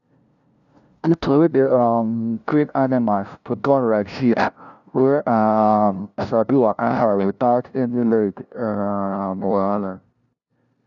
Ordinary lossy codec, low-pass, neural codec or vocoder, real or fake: none; 7.2 kHz; codec, 16 kHz, 0.5 kbps, FunCodec, trained on LibriTTS, 25 frames a second; fake